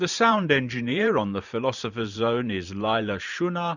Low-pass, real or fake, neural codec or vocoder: 7.2 kHz; fake; vocoder, 44.1 kHz, 128 mel bands every 512 samples, BigVGAN v2